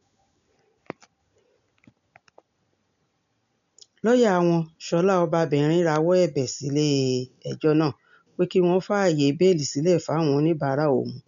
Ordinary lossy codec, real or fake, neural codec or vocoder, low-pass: none; real; none; 7.2 kHz